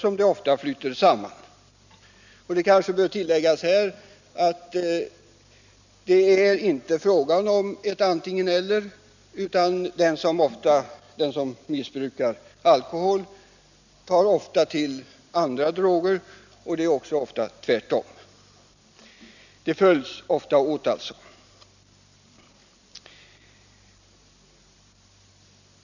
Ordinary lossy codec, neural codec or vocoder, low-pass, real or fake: none; none; 7.2 kHz; real